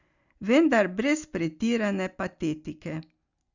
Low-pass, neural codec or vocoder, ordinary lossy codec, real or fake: 7.2 kHz; none; Opus, 64 kbps; real